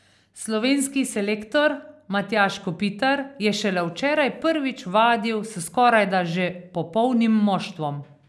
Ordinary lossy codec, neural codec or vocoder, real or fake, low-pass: none; none; real; none